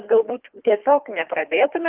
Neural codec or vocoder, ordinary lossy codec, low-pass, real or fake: codec, 24 kHz, 3 kbps, HILCodec; Opus, 32 kbps; 3.6 kHz; fake